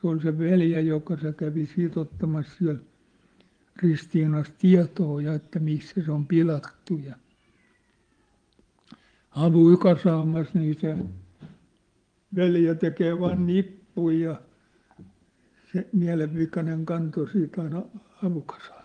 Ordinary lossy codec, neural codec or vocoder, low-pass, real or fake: Opus, 24 kbps; vocoder, 24 kHz, 100 mel bands, Vocos; 9.9 kHz; fake